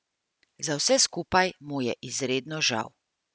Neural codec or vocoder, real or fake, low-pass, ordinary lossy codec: none; real; none; none